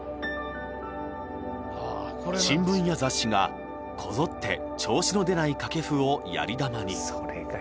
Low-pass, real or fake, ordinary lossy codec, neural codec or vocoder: none; real; none; none